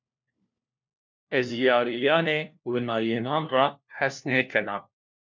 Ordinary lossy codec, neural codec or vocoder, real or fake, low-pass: MP3, 64 kbps; codec, 16 kHz, 1 kbps, FunCodec, trained on LibriTTS, 50 frames a second; fake; 7.2 kHz